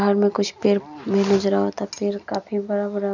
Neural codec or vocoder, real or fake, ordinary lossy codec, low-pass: none; real; none; 7.2 kHz